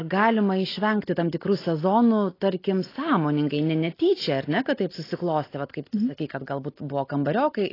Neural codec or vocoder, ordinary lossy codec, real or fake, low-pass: none; AAC, 24 kbps; real; 5.4 kHz